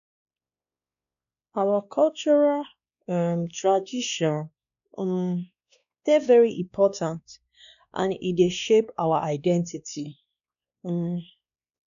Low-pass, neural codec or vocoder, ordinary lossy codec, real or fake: 7.2 kHz; codec, 16 kHz, 2 kbps, X-Codec, WavLM features, trained on Multilingual LibriSpeech; none; fake